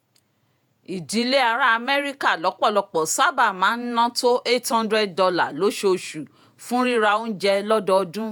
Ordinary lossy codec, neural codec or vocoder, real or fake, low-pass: none; vocoder, 48 kHz, 128 mel bands, Vocos; fake; none